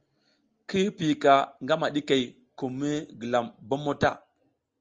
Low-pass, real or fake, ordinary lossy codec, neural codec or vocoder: 7.2 kHz; real; Opus, 24 kbps; none